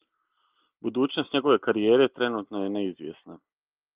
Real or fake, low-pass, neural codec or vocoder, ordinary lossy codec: real; 3.6 kHz; none; Opus, 24 kbps